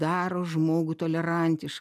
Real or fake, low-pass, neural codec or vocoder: real; 14.4 kHz; none